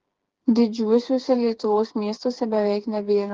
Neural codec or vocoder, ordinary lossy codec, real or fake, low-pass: codec, 16 kHz, 4 kbps, FreqCodec, smaller model; Opus, 16 kbps; fake; 7.2 kHz